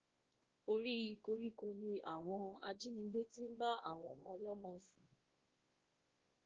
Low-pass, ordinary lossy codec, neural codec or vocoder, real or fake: 7.2 kHz; Opus, 16 kbps; codec, 16 kHz, 1 kbps, X-Codec, WavLM features, trained on Multilingual LibriSpeech; fake